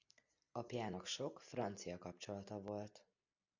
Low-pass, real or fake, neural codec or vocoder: 7.2 kHz; real; none